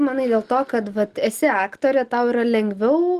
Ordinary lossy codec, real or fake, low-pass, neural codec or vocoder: Opus, 24 kbps; real; 14.4 kHz; none